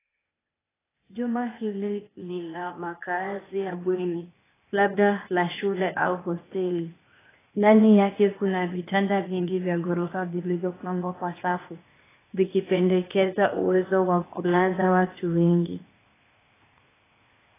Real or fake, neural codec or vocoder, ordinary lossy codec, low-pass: fake; codec, 16 kHz, 0.8 kbps, ZipCodec; AAC, 16 kbps; 3.6 kHz